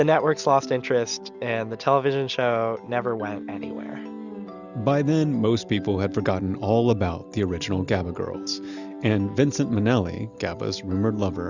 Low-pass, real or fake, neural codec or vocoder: 7.2 kHz; real; none